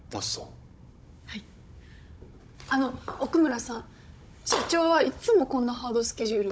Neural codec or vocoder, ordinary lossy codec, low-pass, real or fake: codec, 16 kHz, 16 kbps, FunCodec, trained on Chinese and English, 50 frames a second; none; none; fake